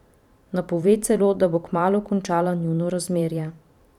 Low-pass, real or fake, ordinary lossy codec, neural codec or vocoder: 19.8 kHz; fake; none; vocoder, 44.1 kHz, 128 mel bands every 512 samples, BigVGAN v2